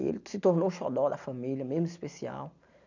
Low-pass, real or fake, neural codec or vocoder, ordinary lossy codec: 7.2 kHz; real; none; none